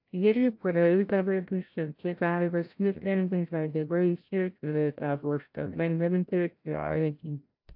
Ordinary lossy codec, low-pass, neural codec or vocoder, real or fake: AAC, 48 kbps; 5.4 kHz; codec, 16 kHz, 0.5 kbps, FreqCodec, larger model; fake